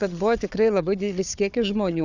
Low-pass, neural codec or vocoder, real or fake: 7.2 kHz; codec, 16 kHz, 4 kbps, FunCodec, trained on Chinese and English, 50 frames a second; fake